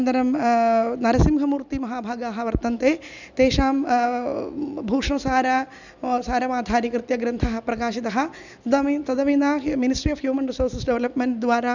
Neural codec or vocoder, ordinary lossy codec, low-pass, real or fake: none; none; 7.2 kHz; real